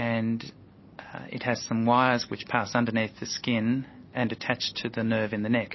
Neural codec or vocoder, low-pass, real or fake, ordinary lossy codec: none; 7.2 kHz; real; MP3, 24 kbps